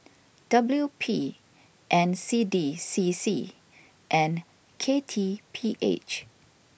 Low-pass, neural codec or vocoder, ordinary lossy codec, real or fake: none; none; none; real